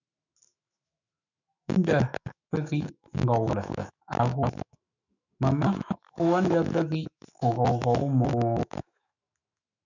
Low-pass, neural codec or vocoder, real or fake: 7.2 kHz; autoencoder, 48 kHz, 128 numbers a frame, DAC-VAE, trained on Japanese speech; fake